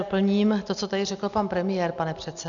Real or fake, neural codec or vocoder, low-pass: real; none; 7.2 kHz